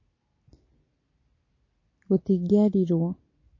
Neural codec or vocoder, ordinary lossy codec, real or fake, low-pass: none; MP3, 32 kbps; real; 7.2 kHz